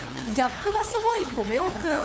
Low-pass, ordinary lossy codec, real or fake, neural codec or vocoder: none; none; fake; codec, 16 kHz, 2 kbps, FunCodec, trained on LibriTTS, 25 frames a second